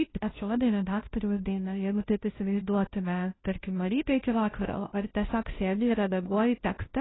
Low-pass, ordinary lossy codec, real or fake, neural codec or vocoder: 7.2 kHz; AAC, 16 kbps; fake; codec, 16 kHz, 0.5 kbps, FunCodec, trained on Chinese and English, 25 frames a second